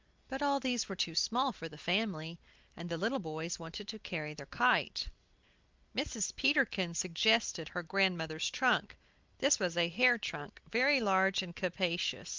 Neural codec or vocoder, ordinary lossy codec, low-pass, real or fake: none; Opus, 32 kbps; 7.2 kHz; real